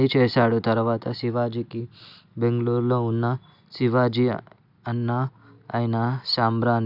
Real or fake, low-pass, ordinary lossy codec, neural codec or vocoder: real; 5.4 kHz; none; none